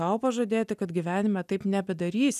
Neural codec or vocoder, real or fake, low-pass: none; real; 14.4 kHz